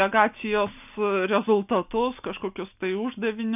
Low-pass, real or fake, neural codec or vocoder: 3.6 kHz; real; none